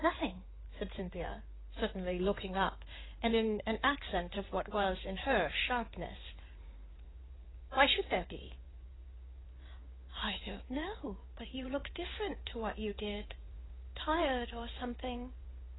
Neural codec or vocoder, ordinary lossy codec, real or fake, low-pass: codec, 16 kHz in and 24 kHz out, 2.2 kbps, FireRedTTS-2 codec; AAC, 16 kbps; fake; 7.2 kHz